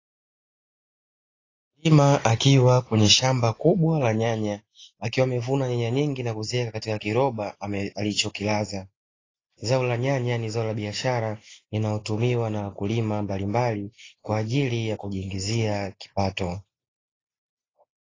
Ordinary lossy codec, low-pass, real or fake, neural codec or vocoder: AAC, 32 kbps; 7.2 kHz; real; none